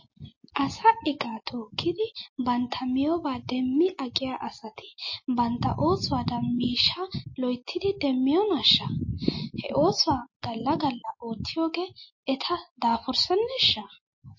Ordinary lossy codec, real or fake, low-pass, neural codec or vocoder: MP3, 32 kbps; real; 7.2 kHz; none